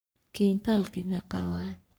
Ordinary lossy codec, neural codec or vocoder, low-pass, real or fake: none; codec, 44.1 kHz, 3.4 kbps, Pupu-Codec; none; fake